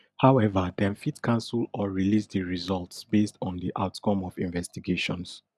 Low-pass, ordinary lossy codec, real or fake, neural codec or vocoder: none; none; real; none